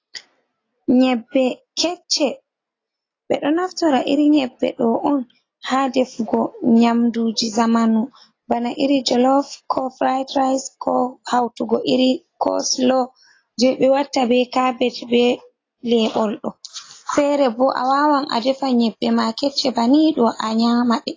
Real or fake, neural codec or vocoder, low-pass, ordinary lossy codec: real; none; 7.2 kHz; AAC, 32 kbps